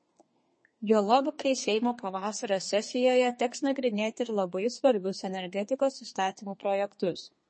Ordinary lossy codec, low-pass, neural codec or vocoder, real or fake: MP3, 32 kbps; 9.9 kHz; codec, 32 kHz, 1.9 kbps, SNAC; fake